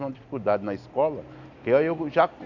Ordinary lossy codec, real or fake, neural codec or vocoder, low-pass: none; real; none; 7.2 kHz